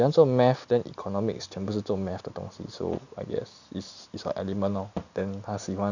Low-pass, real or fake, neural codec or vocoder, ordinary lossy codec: 7.2 kHz; real; none; none